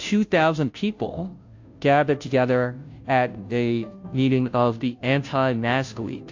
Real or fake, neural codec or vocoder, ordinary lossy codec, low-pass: fake; codec, 16 kHz, 0.5 kbps, FunCodec, trained on Chinese and English, 25 frames a second; AAC, 48 kbps; 7.2 kHz